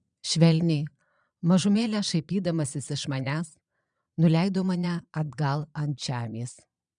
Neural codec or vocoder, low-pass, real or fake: vocoder, 22.05 kHz, 80 mel bands, Vocos; 9.9 kHz; fake